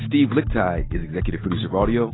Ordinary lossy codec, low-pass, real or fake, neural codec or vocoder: AAC, 16 kbps; 7.2 kHz; real; none